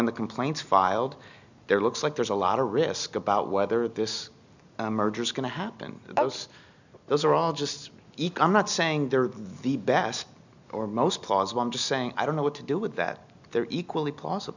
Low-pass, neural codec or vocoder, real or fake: 7.2 kHz; none; real